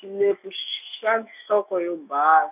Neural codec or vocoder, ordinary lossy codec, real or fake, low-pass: autoencoder, 48 kHz, 128 numbers a frame, DAC-VAE, trained on Japanese speech; none; fake; 3.6 kHz